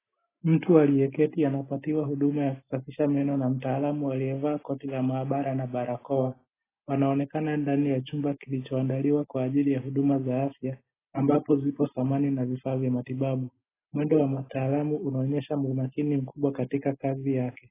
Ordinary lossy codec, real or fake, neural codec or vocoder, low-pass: AAC, 16 kbps; real; none; 3.6 kHz